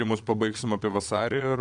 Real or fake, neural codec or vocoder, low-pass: fake; vocoder, 22.05 kHz, 80 mel bands, Vocos; 9.9 kHz